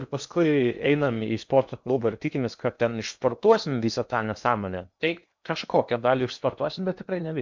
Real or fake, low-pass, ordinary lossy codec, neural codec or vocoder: fake; 7.2 kHz; MP3, 64 kbps; codec, 16 kHz in and 24 kHz out, 0.8 kbps, FocalCodec, streaming, 65536 codes